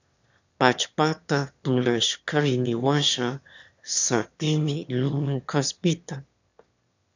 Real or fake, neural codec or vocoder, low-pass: fake; autoencoder, 22.05 kHz, a latent of 192 numbers a frame, VITS, trained on one speaker; 7.2 kHz